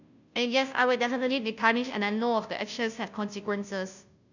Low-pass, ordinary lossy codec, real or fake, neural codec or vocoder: 7.2 kHz; none; fake; codec, 16 kHz, 0.5 kbps, FunCodec, trained on Chinese and English, 25 frames a second